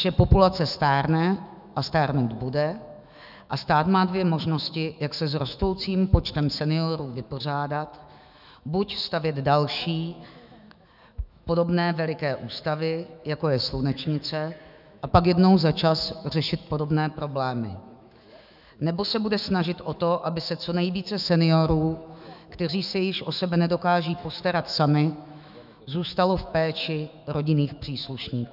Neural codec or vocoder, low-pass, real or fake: autoencoder, 48 kHz, 128 numbers a frame, DAC-VAE, trained on Japanese speech; 5.4 kHz; fake